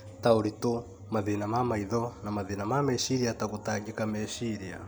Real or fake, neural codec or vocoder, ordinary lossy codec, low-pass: real; none; none; none